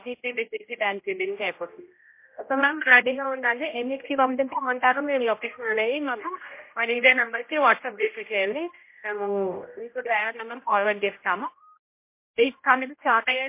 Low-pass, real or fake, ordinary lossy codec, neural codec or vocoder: 3.6 kHz; fake; MP3, 24 kbps; codec, 16 kHz, 0.5 kbps, X-Codec, HuBERT features, trained on general audio